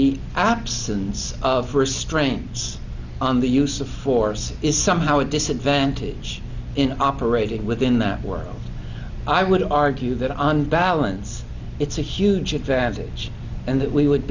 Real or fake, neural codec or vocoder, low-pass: real; none; 7.2 kHz